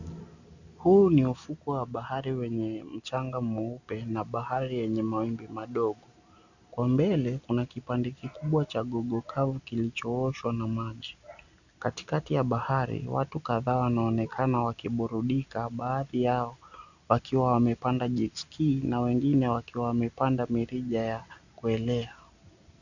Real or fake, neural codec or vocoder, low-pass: real; none; 7.2 kHz